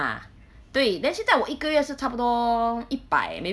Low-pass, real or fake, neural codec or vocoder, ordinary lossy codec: none; real; none; none